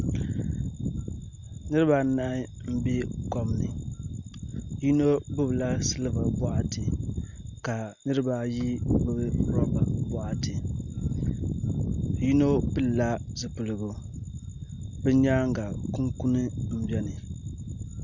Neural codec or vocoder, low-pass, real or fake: none; 7.2 kHz; real